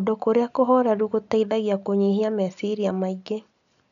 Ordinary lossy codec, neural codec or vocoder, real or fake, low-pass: none; none; real; 7.2 kHz